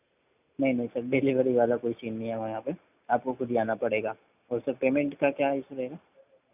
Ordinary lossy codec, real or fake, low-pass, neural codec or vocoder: none; real; 3.6 kHz; none